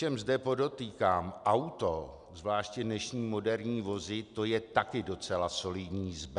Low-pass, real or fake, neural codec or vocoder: 10.8 kHz; real; none